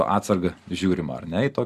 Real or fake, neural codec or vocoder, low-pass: real; none; 14.4 kHz